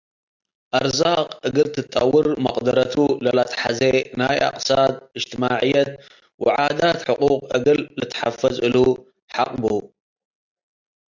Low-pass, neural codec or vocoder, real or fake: 7.2 kHz; none; real